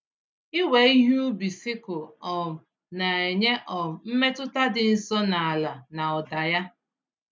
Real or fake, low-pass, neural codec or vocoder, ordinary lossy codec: real; none; none; none